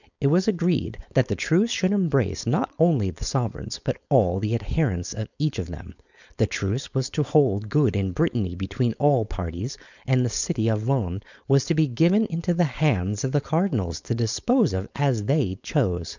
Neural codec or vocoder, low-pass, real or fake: codec, 16 kHz, 4.8 kbps, FACodec; 7.2 kHz; fake